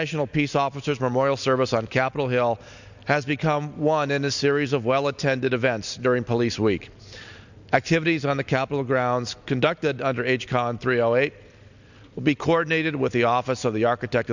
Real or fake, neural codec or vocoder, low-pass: real; none; 7.2 kHz